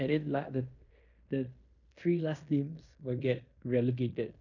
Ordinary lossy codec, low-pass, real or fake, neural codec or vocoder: none; 7.2 kHz; fake; codec, 16 kHz in and 24 kHz out, 0.9 kbps, LongCat-Audio-Codec, fine tuned four codebook decoder